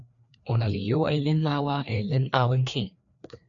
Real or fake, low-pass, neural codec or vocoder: fake; 7.2 kHz; codec, 16 kHz, 2 kbps, FreqCodec, larger model